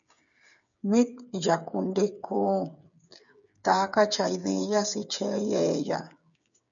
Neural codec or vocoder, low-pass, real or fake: codec, 16 kHz, 8 kbps, FreqCodec, smaller model; 7.2 kHz; fake